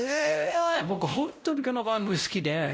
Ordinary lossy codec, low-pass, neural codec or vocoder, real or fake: none; none; codec, 16 kHz, 0.5 kbps, X-Codec, WavLM features, trained on Multilingual LibriSpeech; fake